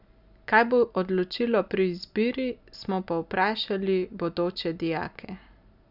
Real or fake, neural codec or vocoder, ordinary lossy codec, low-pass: real; none; none; 5.4 kHz